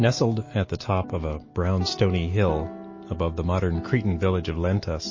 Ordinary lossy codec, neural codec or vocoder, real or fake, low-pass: MP3, 32 kbps; none; real; 7.2 kHz